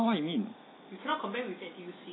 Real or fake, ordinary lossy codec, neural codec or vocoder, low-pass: real; AAC, 16 kbps; none; 7.2 kHz